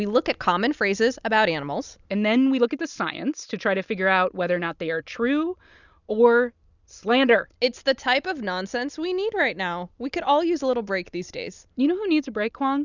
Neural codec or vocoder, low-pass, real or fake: none; 7.2 kHz; real